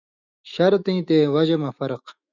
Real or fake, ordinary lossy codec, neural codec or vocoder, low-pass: fake; Opus, 64 kbps; autoencoder, 48 kHz, 128 numbers a frame, DAC-VAE, trained on Japanese speech; 7.2 kHz